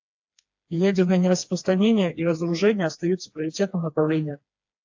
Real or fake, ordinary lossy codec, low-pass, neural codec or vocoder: fake; AAC, 48 kbps; 7.2 kHz; codec, 16 kHz, 2 kbps, FreqCodec, smaller model